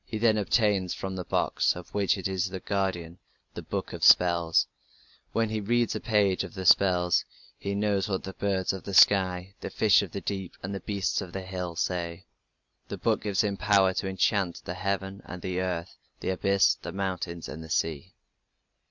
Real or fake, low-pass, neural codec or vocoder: real; 7.2 kHz; none